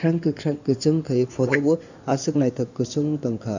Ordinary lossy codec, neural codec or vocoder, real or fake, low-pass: none; codec, 16 kHz in and 24 kHz out, 2.2 kbps, FireRedTTS-2 codec; fake; 7.2 kHz